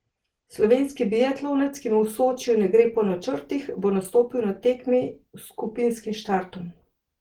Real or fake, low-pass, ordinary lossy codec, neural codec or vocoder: real; 19.8 kHz; Opus, 16 kbps; none